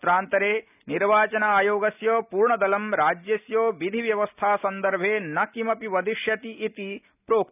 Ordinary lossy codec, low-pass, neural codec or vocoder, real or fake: none; 3.6 kHz; none; real